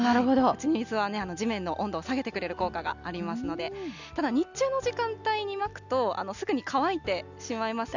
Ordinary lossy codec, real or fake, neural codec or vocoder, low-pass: none; real; none; 7.2 kHz